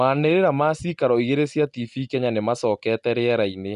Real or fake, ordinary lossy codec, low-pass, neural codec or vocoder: real; none; 10.8 kHz; none